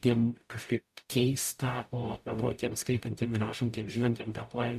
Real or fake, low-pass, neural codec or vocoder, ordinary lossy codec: fake; 14.4 kHz; codec, 44.1 kHz, 0.9 kbps, DAC; AAC, 96 kbps